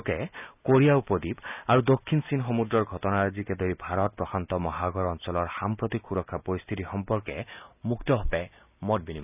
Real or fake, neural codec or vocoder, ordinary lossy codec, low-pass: real; none; none; 3.6 kHz